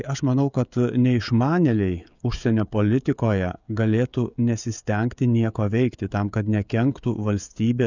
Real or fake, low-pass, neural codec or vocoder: fake; 7.2 kHz; codec, 16 kHz, 16 kbps, FreqCodec, smaller model